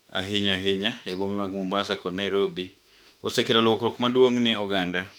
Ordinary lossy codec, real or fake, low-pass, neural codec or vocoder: none; fake; 19.8 kHz; autoencoder, 48 kHz, 32 numbers a frame, DAC-VAE, trained on Japanese speech